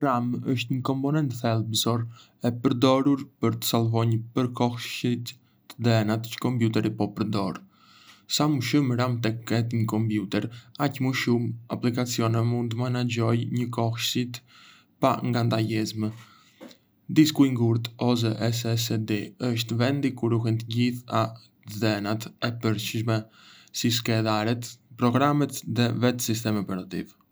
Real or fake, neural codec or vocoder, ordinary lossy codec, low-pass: real; none; none; none